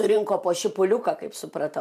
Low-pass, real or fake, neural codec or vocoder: 14.4 kHz; fake; vocoder, 44.1 kHz, 128 mel bands every 512 samples, BigVGAN v2